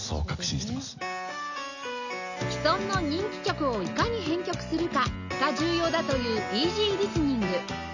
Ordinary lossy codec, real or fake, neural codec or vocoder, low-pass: none; real; none; 7.2 kHz